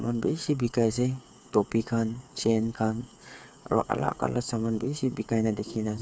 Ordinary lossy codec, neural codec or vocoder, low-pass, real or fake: none; codec, 16 kHz, 8 kbps, FreqCodec, smaller model; none; fake